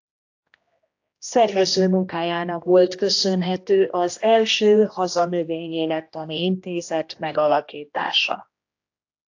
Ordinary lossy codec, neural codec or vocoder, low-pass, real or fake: AAC, 48 kbps; codec, 16 kHz, 1 kbps, X-Codec, HuBERT features, trained on general audio; 7.2 kHz; fake